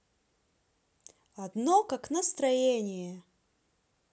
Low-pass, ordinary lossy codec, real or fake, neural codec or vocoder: none; none; real; none